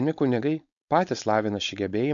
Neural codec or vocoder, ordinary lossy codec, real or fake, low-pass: codec, 16 kHz, 4.8 kbps, FACodec; AAC, 64 kbps; fake; 7.2 kHz